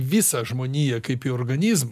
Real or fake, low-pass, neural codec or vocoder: real; 14.4 kHz; none